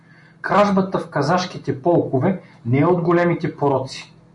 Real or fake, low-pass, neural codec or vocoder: real; 10.8 kHz; none